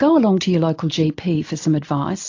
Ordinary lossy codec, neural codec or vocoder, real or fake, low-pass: AAC, 48 kbps; none; real; 7.2 kHz